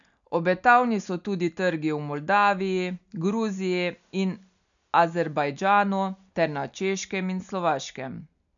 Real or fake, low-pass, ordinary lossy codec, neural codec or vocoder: real; 7.2 kHz; none; none